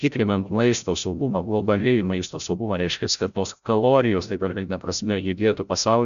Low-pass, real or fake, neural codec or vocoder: 7.2 kHz; fake; codec, 16 kHz, 0.5 kbps, FreqCodec, larger model